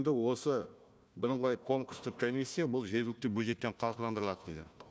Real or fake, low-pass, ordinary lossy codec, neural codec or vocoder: fake; none; none; codec, 16 kHz, 1 kbps, FunCodec, trained on Chinese and English, 50 frames a second